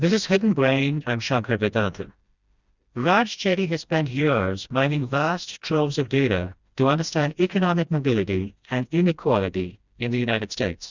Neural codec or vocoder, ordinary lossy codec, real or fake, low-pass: codec, 16 kHz, 1 kbps, FreqCodec, smaller model; Opus, 64 kbps; fake; 7.2 kHz